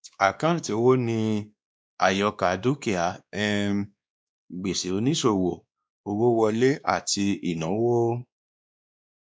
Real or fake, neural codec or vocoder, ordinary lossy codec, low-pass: fake; codec, 16 kHz, 2 kbps, X-Codec, WavLM features, trained on Multilingual LibriSpeech; none; none